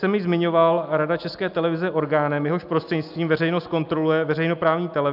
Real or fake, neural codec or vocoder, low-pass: real; none; 5.4 kHz